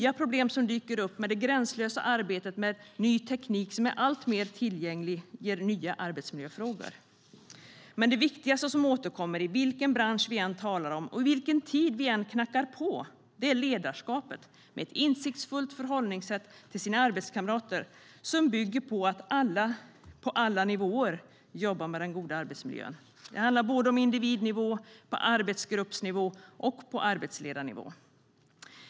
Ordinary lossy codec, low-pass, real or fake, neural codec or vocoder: none; none; real; none